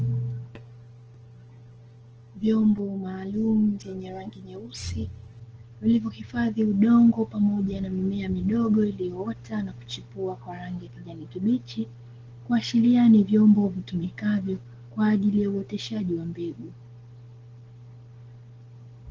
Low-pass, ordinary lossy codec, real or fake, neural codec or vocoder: 7.2 kHz; Opus, 16 kbps; real; none